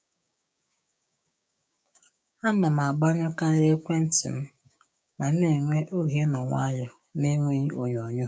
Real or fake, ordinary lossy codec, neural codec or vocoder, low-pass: fake; none; codec, 16 kHz, 6 kbps, DAC; none